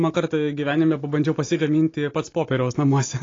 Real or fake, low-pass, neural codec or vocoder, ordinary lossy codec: real; 7.2 kHz; none; AAC, 32 kbps